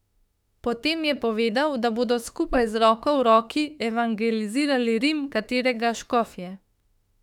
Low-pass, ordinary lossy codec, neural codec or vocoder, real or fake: 19.8 kHz; none; autoencoder, 48 kHz, 32 numbers a frame, DAC-VAE, trained on Japanese speech; fake